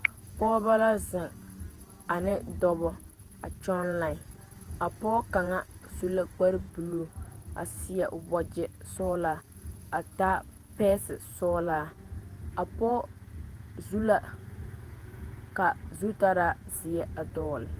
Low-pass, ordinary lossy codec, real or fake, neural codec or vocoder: 14.4 kHz; Opus, 24 kbps; fake; vocoder, 48 kHz, 128 mel bands, Vocos